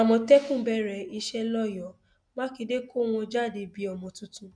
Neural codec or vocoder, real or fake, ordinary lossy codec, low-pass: none; real; none; 9.9 kHz